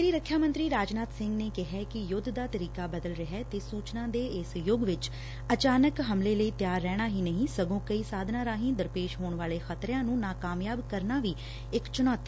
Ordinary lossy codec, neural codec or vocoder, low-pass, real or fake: none; none; none; real